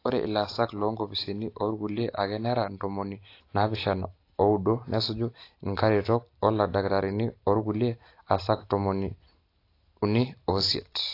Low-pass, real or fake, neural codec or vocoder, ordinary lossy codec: 5.4 kHz; real; none; AAC, 32 kbps